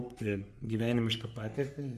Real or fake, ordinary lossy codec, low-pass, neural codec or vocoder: fake; MP3, 96 kbps; 14.4 kHz; codec, 44.1 kHz, 3.4 kbps, Pupu-Codec